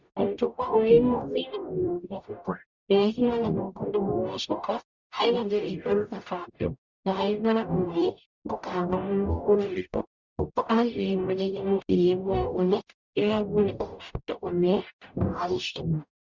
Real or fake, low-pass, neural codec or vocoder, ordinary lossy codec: fake; 7.2 kHz; codec, 44.1 kHz, 0.9 kbps, DAC; Opus, 64 kbps